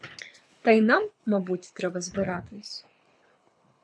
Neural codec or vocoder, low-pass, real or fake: codec, 24 kHz, 6 kbps, HILCodec; 9.9 kHz; fake